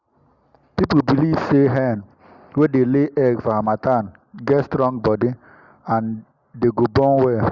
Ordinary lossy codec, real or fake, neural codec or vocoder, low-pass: Opus, 64 kbps; real; none; 7.2 kHz